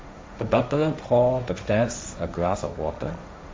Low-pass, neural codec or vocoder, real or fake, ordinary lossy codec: none; codec, 16 kHz, 1.1 kbps, Voila-Tokenizer; fake; none